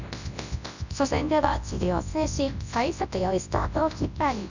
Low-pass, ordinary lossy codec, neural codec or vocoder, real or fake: 7.2 kHz; none; codec, 24 kHz, 0.9 kbps, WavTokenizer, large speech release; fake